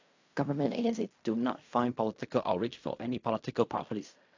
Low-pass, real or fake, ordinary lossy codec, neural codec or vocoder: 7.2 kHz; fake; AAC, 48 kbps; codec, 16 kHz in and 24 kHz out, 0.4 kbps, LongCat-Audio-Codec, fine tuned four codebook decoder